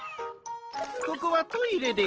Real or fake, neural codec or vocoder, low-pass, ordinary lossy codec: real; none; 7.2 kHz; Opus, 16 kbps